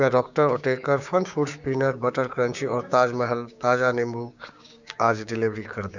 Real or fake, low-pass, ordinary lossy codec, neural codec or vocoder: fake; 7.2 kHz; none; codec, 16 kHz, 6 kbps, DAC